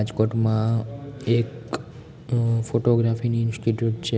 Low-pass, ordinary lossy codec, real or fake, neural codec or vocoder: none; none; real; none